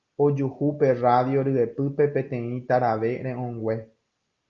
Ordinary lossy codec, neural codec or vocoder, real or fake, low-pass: Opus, 24 kbps; none; real; 7.2 kHz